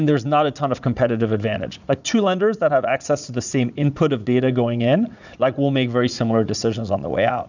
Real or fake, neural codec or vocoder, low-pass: fake; vocoder, 44.1 kHz, 80 mel bands, Vocos; 7.2 kHz